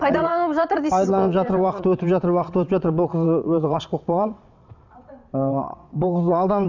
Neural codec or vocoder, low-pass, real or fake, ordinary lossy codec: vocoder, 22.05 kHz, 80 mel bands, WaveNeXt; 7.2 kHz; fake; none